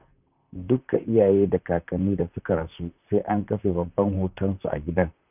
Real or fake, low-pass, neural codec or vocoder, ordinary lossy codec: fake; 3.6 kHz; vocoder, 44.1 kHz, 128 mel bands, Pupu-Vocoder; none